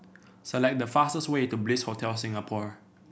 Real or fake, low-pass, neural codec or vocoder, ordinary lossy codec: real; none; none; none